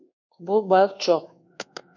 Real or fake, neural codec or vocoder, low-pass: fake; codec, 24 kHz, 1.2 kbps, DualCodec; 7.2 kHz